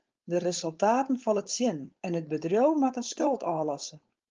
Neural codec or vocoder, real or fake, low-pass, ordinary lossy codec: codec, 16 kHz, 4.8 kbps, FACodec; fake; 7.2 kHz; Opus, 24 kbps